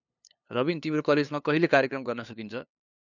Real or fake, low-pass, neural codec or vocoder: fake; 7.2 kHz; codec, 16 kHz, 2 kbps, FunCodec, trained on LibriTTS, 25 frames a second